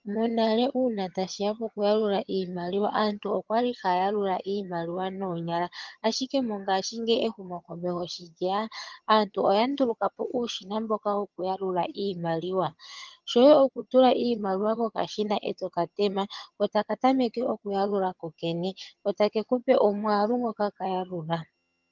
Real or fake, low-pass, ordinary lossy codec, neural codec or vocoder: fake; 7.2 kHz; Opus, 32 kbps; vocoder, 22.05 kHz, 80 mel bands, HiFi-GAN